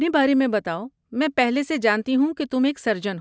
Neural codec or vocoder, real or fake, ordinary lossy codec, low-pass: none; real; none; none